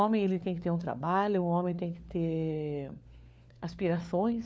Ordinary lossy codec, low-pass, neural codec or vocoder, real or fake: none; none; codec, 16 kHz, 4 kbps, FunCodec, trained on LibriTTS, 50 frames a second; fake